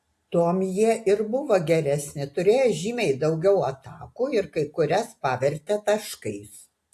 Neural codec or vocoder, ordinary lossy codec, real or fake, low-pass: none; AAC, 64 kbps; real; 14.4 kHz